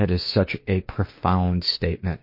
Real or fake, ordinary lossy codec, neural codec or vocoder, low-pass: fake; MP3, 32 kbps; autoencoder, 48 kHz, 32 numbers a frame, DAC-VAE, trained on Japanese speech; 5.4 kHz